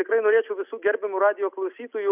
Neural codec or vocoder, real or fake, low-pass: none; real; 3.6 kHz